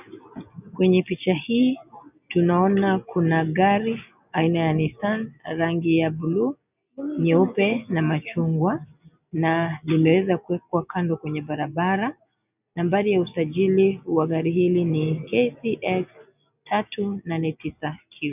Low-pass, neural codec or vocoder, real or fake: 3.6 kHz; none; real